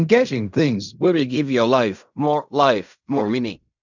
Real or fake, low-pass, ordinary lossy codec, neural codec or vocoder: fake; 7.2 kHz; none; codec, 16 kHz in and 24 kHz out, 0.4 kbps, LongCat-Audio-Codec, fine tuned four codebook decoder